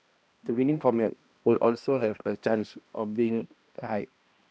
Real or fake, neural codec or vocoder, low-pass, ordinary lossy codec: fake; codec, 16 kHz, 1 kbps, X-Codec, HuBERT features, trained on balanced general audio; none; none